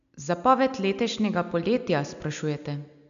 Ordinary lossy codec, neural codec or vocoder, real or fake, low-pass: none; none; real; 7.2 kHz